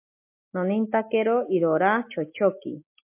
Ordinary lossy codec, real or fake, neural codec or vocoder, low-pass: MP3, 24 kbps; real; none; 3.6 kHz